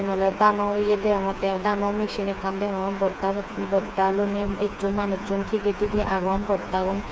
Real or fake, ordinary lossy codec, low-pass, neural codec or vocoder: fake; none; none; codec, 16 kHz, 4 kbps, FreqCodec, smaller model